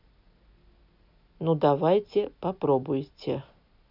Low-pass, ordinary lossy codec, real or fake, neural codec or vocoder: 5.4 kHz; none; real; none